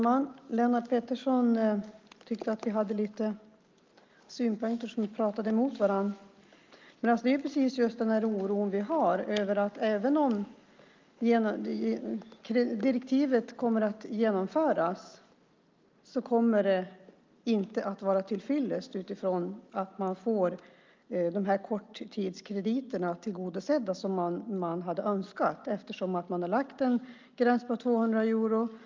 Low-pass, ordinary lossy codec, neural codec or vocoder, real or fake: 7.2 kHz; Opus, 24 kbps; none; real